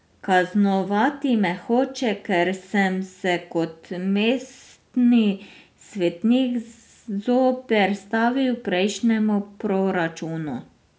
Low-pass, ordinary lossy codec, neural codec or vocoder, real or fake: none; none; none; real